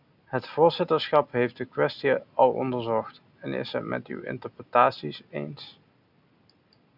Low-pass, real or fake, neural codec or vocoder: 5.4 kHz; real; none